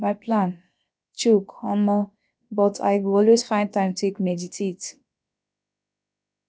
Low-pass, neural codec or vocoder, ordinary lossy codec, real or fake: none; codec, 16 kHz, about 1 kbps, DyCAST, with the encoder's durations; none; fake